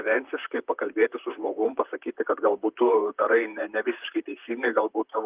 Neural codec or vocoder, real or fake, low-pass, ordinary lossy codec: vocoder, 44.1 kHz, 128 mel bands, Pupu-Vocoder; fake; 3.6 kHz; Opus, 32 kbps